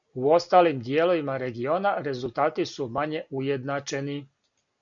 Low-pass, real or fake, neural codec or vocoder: 7.2 kHz; real; none